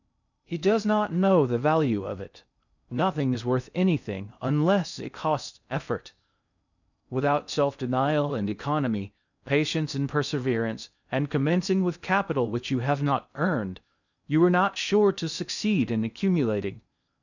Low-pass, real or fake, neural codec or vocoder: 7.2 kHz; fake; codec, 16 kHz in and 24 kHz out, 0.6 kbps, FocalCodec, streaming, 2048 codes